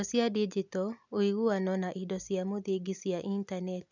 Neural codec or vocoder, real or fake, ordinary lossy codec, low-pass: none; real; none; 7.2 kHz